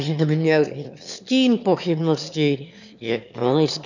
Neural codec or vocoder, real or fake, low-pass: autoencoder, 22.05 kHz, a latent of 192 numbers a frame, VITS, trained on one speaker; fake; 7.2 kHz